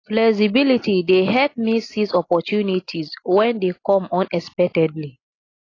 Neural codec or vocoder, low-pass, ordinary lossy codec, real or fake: none; 7.2 kHz; AAC, 32 kbps; real